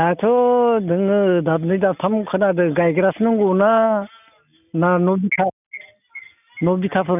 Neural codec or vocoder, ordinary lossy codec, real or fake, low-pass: none; none; real; 3.6 kHz